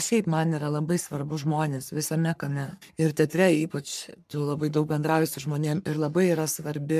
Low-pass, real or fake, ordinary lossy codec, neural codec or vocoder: 14.4 kHz; fake; MP3, 96 kbps; codec, 44.1 kHz, 3.4 kbps, Pupu-Codec